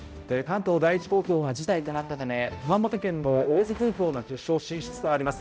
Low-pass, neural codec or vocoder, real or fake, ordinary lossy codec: none; codec, 16 kHz, 0.5 kbps, X-Codec, HuBERT features, trained on balanced general audio; fake; none